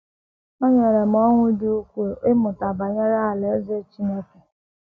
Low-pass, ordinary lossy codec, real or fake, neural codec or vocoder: none; none; real; none